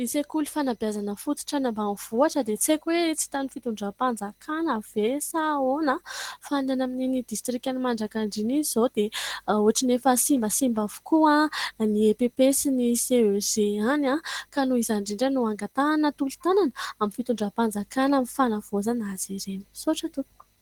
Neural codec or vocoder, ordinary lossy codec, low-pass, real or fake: none; Opus, 16 kbps; 19.8 kHz; real